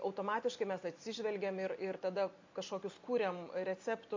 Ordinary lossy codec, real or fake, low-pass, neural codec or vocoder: MP3, 48 kbps; real; 7.2 kHz; none